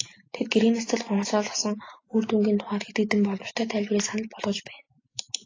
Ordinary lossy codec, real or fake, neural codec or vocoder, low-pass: AAC, 32 kbps; real; none; 7.2 kHz